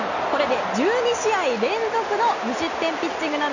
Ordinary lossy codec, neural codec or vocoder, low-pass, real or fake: none; none; 7.2 kHz; real